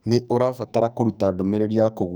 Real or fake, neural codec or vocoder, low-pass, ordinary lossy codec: fake; codec, 44.1 kHz, 2.6 kbps, SNAC; none; none